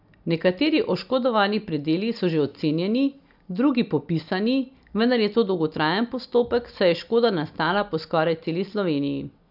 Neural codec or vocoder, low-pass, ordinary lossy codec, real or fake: none; 5.4 kHz; none; real